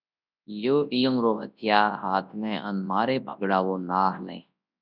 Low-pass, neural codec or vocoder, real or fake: 5.4 kHz; codec, 24 kHz, 0.9 kbps, WavTokenizer, large speech release; fake